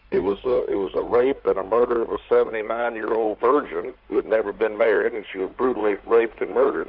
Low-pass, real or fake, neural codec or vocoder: 5.4 kHz; fake; codec, 16 kHz in and 24 kHz out, 2.2 kbps, FireRedTTS-2 codec